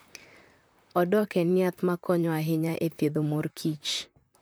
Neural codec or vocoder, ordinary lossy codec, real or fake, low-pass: vocoder, 44.1 kHz, 128 mel bands, Pupu-Vocoder; none; fake; none